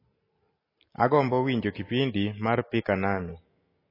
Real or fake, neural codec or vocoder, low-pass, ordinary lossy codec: real; none; 5.4 kHz; MP3, 24 kbps